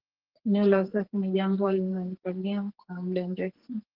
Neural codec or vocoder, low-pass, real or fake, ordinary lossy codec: codec, 44.1 kHz, 2.6 kbps, SNAC; 5.4 kHz; fake; Opus, 16 kbps